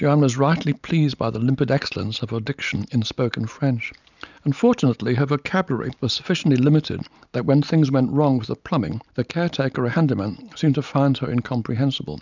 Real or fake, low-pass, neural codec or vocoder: real; 7.2 kHz; none